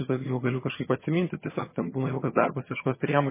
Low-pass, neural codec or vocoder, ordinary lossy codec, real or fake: 3.6 kHz; vocoder, 22.05 kHz, 80 mel bands, HiFi-GAN; MP3, 16 kbps; fake